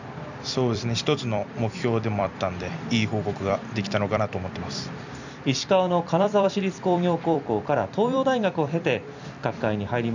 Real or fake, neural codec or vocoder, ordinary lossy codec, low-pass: fake; vocoder, 44.1 kHz, 128 mel bands every 256 samples, BigVGAN v2; none; 7.2 kHz